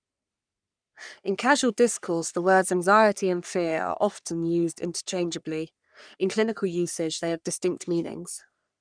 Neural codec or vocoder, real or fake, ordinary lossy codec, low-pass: codec, 44.1 kHz, 3.4 kbps, Pupu-Codec; fake; none; 9.9 kHz